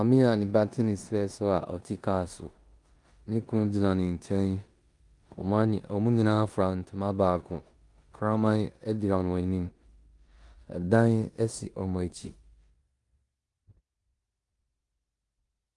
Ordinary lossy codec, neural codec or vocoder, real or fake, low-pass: Opus, 32 kbps; codec, 16 kHz in and 24 kHz out, 0.9 kbps, LongCat-Audio-Codec, four codebook decoder; fake; 10.8 kHz